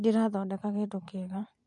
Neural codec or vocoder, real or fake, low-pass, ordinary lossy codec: vocoder, 44.1 kHz, 128 mel bands every 512 samples, BigVGAN v2; fake; 10.8 kHz; MP3, 48 kbps